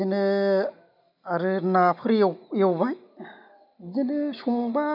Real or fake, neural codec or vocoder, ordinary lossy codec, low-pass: real; none; none; 5.4 kHz